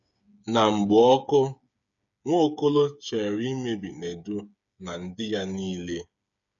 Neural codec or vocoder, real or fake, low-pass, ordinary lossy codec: codec, 16 kHz, 8 kbps, FreqCodec, smaller model; fake; 7.2 kHz; none